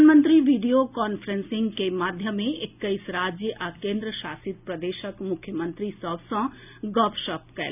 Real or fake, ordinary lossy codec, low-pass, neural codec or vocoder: real; none; 3.6 kHz; none